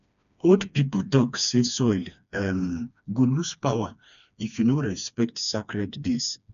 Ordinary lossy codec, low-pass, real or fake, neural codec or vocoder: none; 7.2 kHz; fake; codec, 16 kHz, 2 kbps, FreqCodec, smaller model